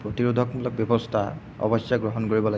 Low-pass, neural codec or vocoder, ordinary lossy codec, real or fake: none; none; none; real